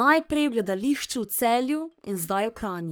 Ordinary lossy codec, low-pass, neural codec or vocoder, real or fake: none; none; codec, 44.1 kHz, 3.4 kbps, Pupu-Codec; fake